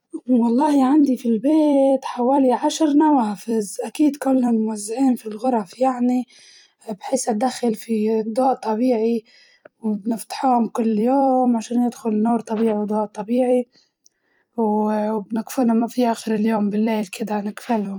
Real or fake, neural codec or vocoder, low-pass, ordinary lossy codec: fake; vocoder, 44.1 kHz, 128 mel bands every 256 samples, BigVGAN v2; 19.8 kHz; none